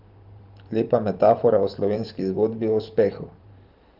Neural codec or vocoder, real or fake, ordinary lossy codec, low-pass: vocoder, 44.1 kHz, 128 mel bands every 512 samples, BigVGAN v2; fake; Opus, 24 kbps; 5.4 kHz